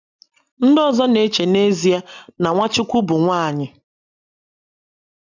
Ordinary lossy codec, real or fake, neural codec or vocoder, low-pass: none; real; none; 7.2 kHz